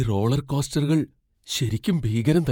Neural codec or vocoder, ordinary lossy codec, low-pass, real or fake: vocoder, 48 kHz, 128 mel bands, Vocos; MP3, 96 kbps; 19.8 kHz; fake